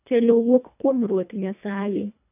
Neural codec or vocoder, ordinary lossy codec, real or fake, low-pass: codec, 24 kHz, 1.5 kbps, HILCodec; none; fake; 3.6 kHz